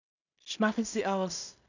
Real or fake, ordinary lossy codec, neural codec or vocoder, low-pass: fake; none; codec, 16 kHz in and 24 kHz out, 0.4 kbps, LongCat-Audio-Codec, two codebook decoder; 7.2 kHz